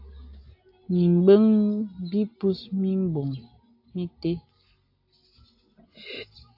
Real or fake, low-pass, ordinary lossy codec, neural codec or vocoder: real; 5.4 kHz; AAC, 48 kbps; none